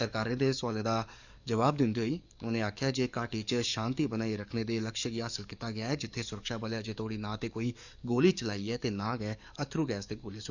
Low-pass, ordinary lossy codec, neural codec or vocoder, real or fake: 7.2 kHz; none; codec, 44.1 kHz, 7.8 kbps, DAC; fake